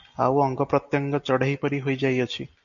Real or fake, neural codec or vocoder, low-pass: real; none; 7.2 kHz